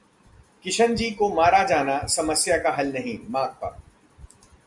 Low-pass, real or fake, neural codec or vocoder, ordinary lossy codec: 10.8 kHz; real; none; Opus, 64 kbps